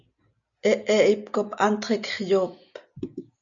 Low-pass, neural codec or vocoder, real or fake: 7.2 kHz; none; real